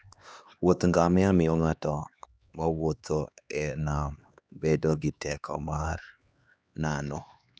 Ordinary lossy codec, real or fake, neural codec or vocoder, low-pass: none; fake; codec, 16 kHz, 2 kbps, X-Codec, HuBERT features, trained on LibriSpeech; none